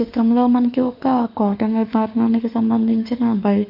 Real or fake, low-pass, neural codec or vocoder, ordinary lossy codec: fake; 5.4 kHz; autoencoder, 48 kHz, 32 numbers a frame, DAC-VAE, trained on Japanese speech; none